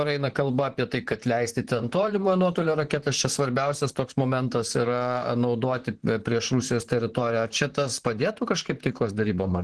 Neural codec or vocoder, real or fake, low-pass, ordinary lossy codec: vocoder, 44.1 kHz, 128 mel bands, Pupu-Vocoder; fake; 10.8 kHz; Opus, 16 kbps